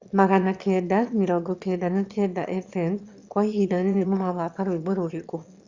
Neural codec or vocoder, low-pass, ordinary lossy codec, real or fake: autoencoder, 22.05 kHz, a latent of 192 numbers a frame, VITS, trained on one speaker; 7.2 kHz; Opus, 64 kbps; fake